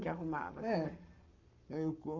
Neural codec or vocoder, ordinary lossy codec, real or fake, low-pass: codec, 44.1 kHz, 7.8 kbps, Pupu-Codec; none; fake; 7.2 kHz